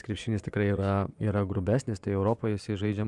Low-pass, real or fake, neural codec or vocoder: 10.8 kHz; fake; vocoder, 24 kHz, 100 mel bands, Vocos